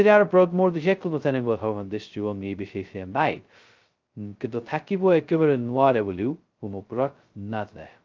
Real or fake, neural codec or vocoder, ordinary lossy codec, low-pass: fake; codec, 16 kHz, 0.2 kbps, FocalCodec; Opus, 24 kbps; 7.2 kHz